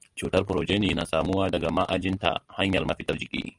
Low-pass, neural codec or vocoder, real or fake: 10.8 kHz; none; real